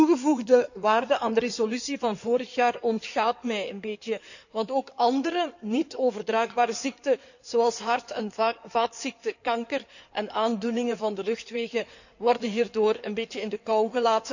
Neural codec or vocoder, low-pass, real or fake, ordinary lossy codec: codec, 16 kHz in and 24 kHz out, 2.2 kbps, FireRedTTS-2 codec; 7.2 kHz; fake; none